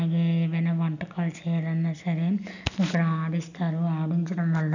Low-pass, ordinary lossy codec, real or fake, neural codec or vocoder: 7.2 kHz; none; real; none